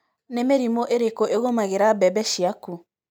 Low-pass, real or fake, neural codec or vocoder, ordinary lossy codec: none; real; none; none